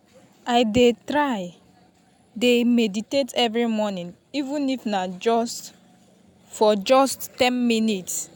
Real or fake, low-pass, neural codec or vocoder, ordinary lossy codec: real; none; none; none